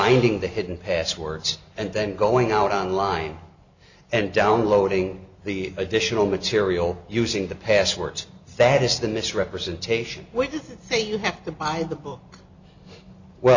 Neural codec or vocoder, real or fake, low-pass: none; real; 7.2 kHz